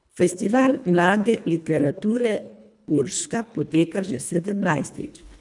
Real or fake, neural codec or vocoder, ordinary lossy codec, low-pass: fake; codec, 24 kHz, 1.5 kbps, HILCodec; none; 10.8 kHz